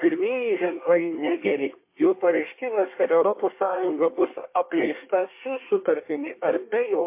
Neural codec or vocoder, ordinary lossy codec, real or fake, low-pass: codec, 24 kHz, 1 kbps, SNAC; MP3, 24 kbps; fake; 3.6 kHz